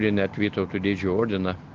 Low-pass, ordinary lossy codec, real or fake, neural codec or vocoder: 7.2 kHz; Opus, 24 kbps; real; none